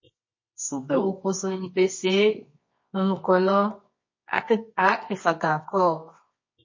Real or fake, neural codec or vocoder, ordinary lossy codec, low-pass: fake; codec, 24 kHz, 0.9 kbps, WavTokenizer, medium music audio release; MP3, 32 kbps; 7.2 kHz